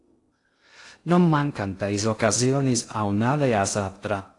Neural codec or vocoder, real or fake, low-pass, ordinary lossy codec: codec, 16 kHz in and 24 kHz out, 0.6 kbps, FocalCodec, streaming, 2048 codes; fake; 10.8 kHz; AAC, 48 kbps